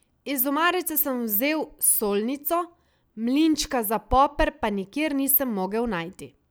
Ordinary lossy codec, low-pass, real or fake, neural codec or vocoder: none; none; real; none